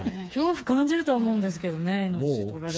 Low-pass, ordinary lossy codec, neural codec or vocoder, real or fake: none; none; codec, 16 kHz, 4 kbps, FreqCodec, smaller model; fake